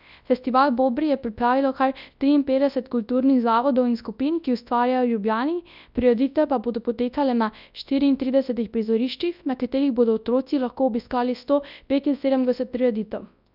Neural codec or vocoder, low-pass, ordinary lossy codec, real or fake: codec, 24 kHz, 0.9 kbps, WavTokenizer, large speech release; 5.4 kHz; none; fake